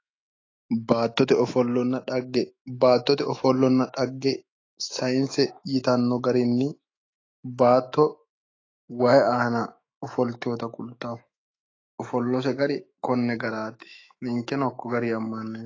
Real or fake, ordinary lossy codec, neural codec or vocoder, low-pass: fake; AAC, 32 kbps; autoencoder, 48 kHz, 128 numbers a frame, DAC-VAE, trained on Japanese speech; 7.2 kHz